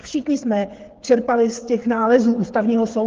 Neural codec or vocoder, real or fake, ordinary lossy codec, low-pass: codec, 16 kHz, 8 kbps, FreqCodec, smaller model; fake; Opus, 16 kbps; 7.2 kHz